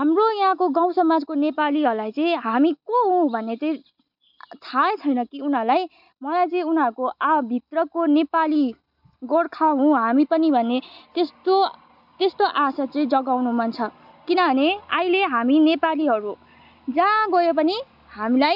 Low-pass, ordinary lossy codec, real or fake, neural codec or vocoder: 5.4 kHz; none; real; none